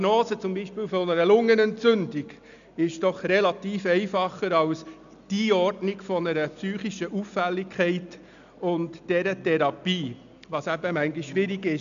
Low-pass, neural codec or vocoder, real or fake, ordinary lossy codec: 7.2 kHz; none; real; none